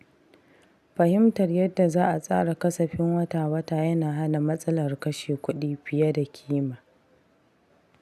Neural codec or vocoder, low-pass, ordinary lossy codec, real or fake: none; 14.4 kHz; none; real